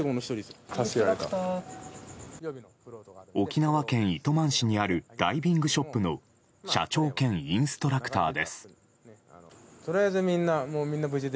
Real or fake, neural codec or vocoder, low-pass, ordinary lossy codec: real; none; none; none